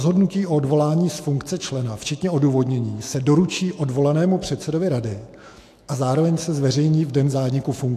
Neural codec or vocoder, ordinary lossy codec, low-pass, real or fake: autoencoder, 48 kHz, 128 numbers a frame, DAC-VAE, trained on Japanese speech; AAC, 64 kbps; 14.4 kHz; fake